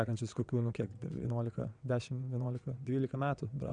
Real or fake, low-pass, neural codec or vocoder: fake; 9.9 kHz; vocoder, 22.05 kHz, 80 mel bands, WaveNeXt